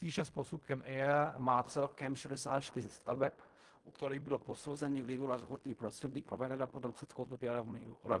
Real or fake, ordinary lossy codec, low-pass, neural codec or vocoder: fake; Opus, 24 kbps; 10.8 kHz; codec, 16 kHz in and 24 kHz out, 0.4 kbps, LongCat-Audio-Codec, fine tuned four codebook decoder